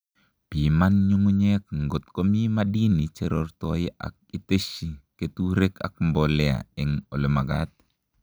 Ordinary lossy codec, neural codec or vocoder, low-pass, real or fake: none; none; none; real